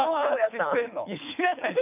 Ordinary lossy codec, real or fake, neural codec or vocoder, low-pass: none; fake; codec, 24 kHz, 6 kbps, HILCodec; 3.6 kHz